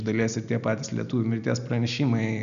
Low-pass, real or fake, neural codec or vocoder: 7.2 kHz; real; none